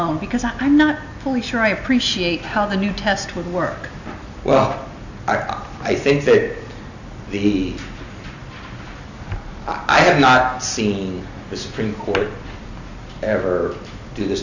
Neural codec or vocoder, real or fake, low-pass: none; real; 7.2 kHz